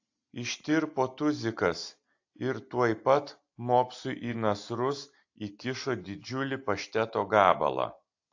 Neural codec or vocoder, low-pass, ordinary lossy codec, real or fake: none; 7.2 kHz; AAC, 48 kbps; real